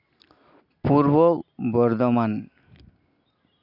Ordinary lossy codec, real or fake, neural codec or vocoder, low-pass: none; real; none; 5.4 kHz